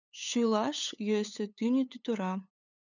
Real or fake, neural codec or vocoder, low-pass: fake; codec, 16 kHz, 8 kbps, FunCodec, trained on LibriTTS, 25 frames a second; 7.2 kHz